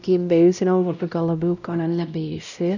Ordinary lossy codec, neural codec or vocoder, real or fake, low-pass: none; codec, 16 kHz, 0.5 kbps, X-Codec, WavLM features, trained on Multilingual LibriSpeech; fake; 7.2 kHz